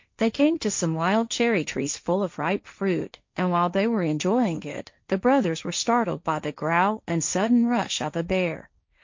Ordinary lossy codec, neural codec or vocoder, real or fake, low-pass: MP3, 48 kbps; codec, 16 kHz, 1.1 kbps, Voila-Tokenizer; fake; 7.2 kHz